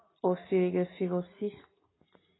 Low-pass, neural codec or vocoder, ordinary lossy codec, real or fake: 7.2 kHz; none; AAC, 16 kbps; real